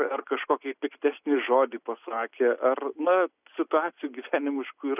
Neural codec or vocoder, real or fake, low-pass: none; real; 3.6 kHz